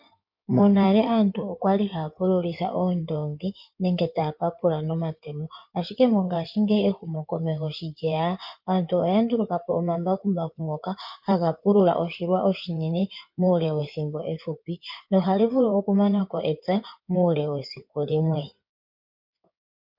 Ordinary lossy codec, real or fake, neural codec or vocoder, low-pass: MP3, 48 kbps; fake; codec, 16 kHz in and 24 kHz out, 2.2 kbps, FireRedTTS-2 codec; 5.4 kHz